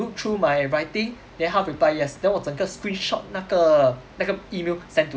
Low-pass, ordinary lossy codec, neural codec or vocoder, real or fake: none; none; none; real